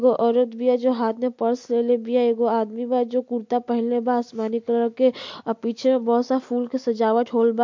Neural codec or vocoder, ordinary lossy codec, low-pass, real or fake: none; MP3, 48 kbps; 7.2 kHz; real